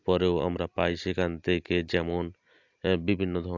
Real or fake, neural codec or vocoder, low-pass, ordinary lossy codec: real; none; 7.2 kHz; AAC, 48 kbps